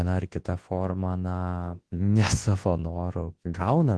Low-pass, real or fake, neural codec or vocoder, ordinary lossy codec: 10.8 kHz; fake; codec, 24 kHz, 0.9 kbps, WavTokenizer, large speech release; Opus, 16 kbps